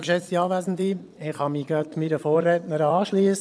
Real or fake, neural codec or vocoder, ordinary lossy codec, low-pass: fake; vocoder, 22.05 kHz, 80 mel bands, Vocos; none; none